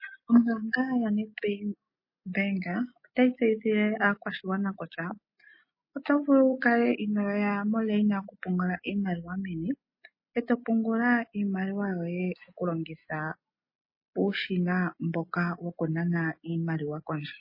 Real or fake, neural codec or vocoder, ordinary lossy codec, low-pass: real; none; MP3, 24 kbps; 5.4 kHz